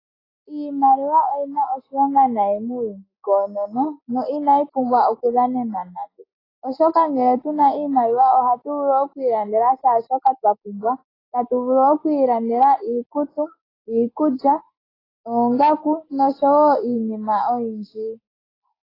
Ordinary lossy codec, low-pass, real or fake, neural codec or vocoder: AAC, 24 kbps; 5.4 kHz; real; none